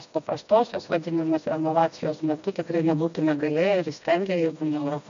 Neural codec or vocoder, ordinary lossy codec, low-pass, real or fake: codec, 16 kHz, 1 kbps, FreqCodec, smaller model; MP3, 64 kbps; 7.2 kHz; fake